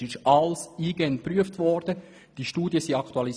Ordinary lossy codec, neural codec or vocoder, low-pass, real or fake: none; none; none; real